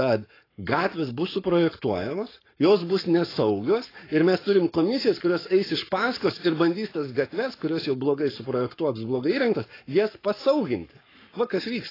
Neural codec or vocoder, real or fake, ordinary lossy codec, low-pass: codec, 44.1 kHz, 7.8 kbps, Pupu-Codec; fake; AAC, 24 kbps; 5.4 kHz